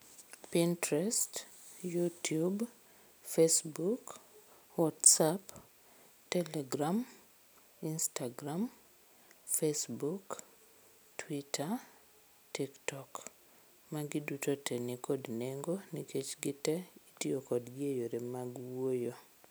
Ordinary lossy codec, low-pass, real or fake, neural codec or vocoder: none; none; real; none